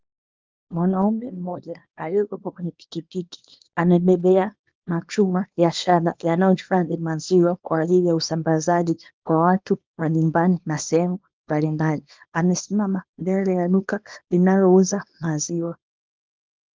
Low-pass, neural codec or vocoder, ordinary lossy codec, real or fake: 7.2 kHz; codec, 24 kHz, 0.9 kbps, WavTokenizer, small release; Opus, 32 kbps; fake